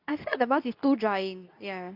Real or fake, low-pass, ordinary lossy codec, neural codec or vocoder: fake; 5.4 kHz; none; codec, 24 kHz, 0.9 kbps, WavTokenizer, medium speech release version 2